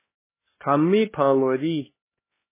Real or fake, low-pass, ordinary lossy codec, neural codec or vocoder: fake; 3.6 kHz; MP3, 16 kbps; codec, 16 kHz, 1 kbps, X-Codec, HuBERT features, trained on LibriSpeech